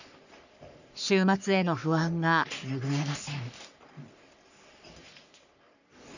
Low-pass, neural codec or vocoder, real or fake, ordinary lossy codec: 7.2 kHz; codec, 44.1 kHz, 3.4 kbps, Pupu-Codec; fake; none